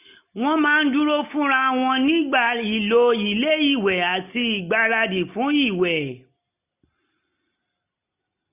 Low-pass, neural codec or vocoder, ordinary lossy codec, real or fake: 3.6 kHz; none; none; real